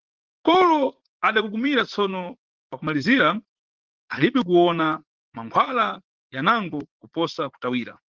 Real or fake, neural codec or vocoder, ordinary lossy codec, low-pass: fake; vocoder, 44.1 kHz, 80 mel bands, Vocos; Opus, 16 kbps; 7.2 kHz